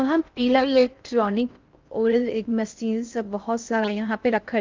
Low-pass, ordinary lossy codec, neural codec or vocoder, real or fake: 7.2 kHz; Opus, 24 kbps; codec, 16 kHz in and 24 kHz out, 0.6 kbps, FocalCodec, streaming, 4096 codes; fake